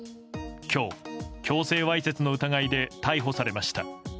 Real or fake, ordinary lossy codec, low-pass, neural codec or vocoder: real; none; none; none